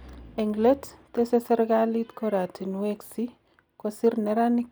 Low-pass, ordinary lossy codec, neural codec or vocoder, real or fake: none; none; none; real